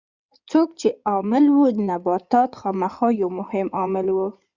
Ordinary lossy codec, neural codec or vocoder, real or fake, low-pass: Opus, 64 kbps; codec, 16 kHz in and 24 kHz out, 2.2 kbps, FireRedTTS-2 codec; fake; 7.2 kHz